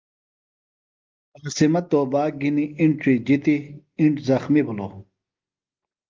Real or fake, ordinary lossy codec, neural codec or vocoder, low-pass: real; Opus, 32 kbps; none; 7.2 kHz